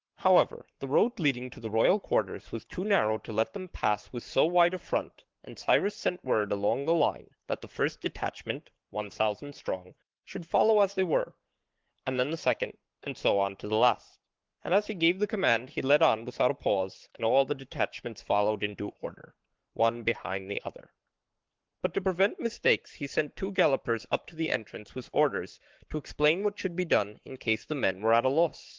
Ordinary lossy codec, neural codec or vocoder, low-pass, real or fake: Opus, 16 kbps; codec, 44.1 kHz, 7.8 kbps, Pupu-Codec; 7.2 kHz; fake